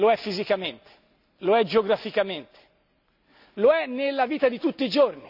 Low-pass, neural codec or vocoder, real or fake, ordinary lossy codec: 5.4 kHz; none; real; none